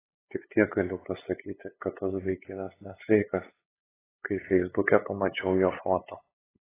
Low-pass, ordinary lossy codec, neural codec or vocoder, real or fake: 3.6 kHz; AAC, 16 kbps; codec, 16 kHz, 8 kbps, FunCodec, trained on LibriTTS, 25 frames a second; fake